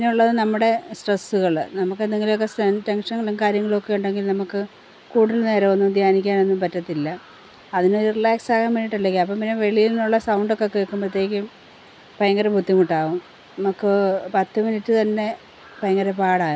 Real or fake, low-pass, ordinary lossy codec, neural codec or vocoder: real; none; none; none